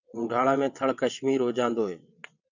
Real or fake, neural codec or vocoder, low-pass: fake; vocoder, 22.05 kHz, 80 mel bands, WaveNeXt; 7.2 kHz